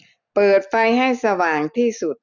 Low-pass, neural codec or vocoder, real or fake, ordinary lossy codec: 7.2 kHz; none; real; none